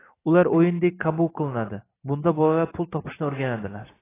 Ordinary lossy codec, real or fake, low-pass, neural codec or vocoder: AAC, 16 kbps; real; 3.6 kHz; none